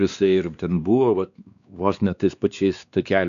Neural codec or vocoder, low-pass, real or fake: codec, 16 kHz, 2 kbps, X-Codec, WavLM features, trained on Multilingual LibriSpeech; 7.2 kHz; fake